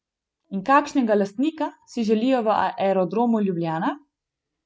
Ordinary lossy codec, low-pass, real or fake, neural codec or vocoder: none; none; real; none